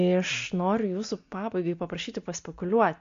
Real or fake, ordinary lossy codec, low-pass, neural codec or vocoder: real; MP3, 48 kbps; 7.2 kHz; none